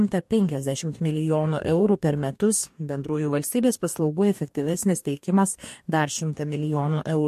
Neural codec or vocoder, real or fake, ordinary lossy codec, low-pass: codec, 44.1 kHz, 2.6 kbps, DAC; fake; MP3, 64 kbps; 14.4 kHz